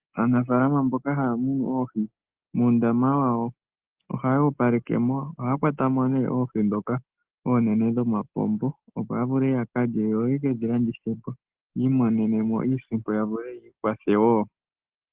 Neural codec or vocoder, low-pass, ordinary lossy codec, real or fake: none; 3.6 kHz; Opus, 16 kbps; real